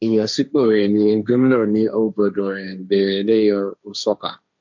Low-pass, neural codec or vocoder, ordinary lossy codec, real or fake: 7.2 kHz; codec, 16 kHz, 1.1 kbps, Voila-Tokenizer; MP3, 64 kbps; fake